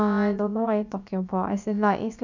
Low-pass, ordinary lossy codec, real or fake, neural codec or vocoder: 7.2 kHz; none; fake; codec, 16 kHz, about 1 kbps, DyCAST, with the encoder's durations